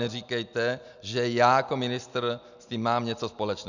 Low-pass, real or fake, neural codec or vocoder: 7.2 kHz; real; none